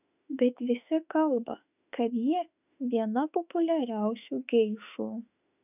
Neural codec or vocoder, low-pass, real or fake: autoencoder, 48 kHz, 32 numbers a frame, DAC-VAE, trained on Japanese speech; 3.6 kHz; fake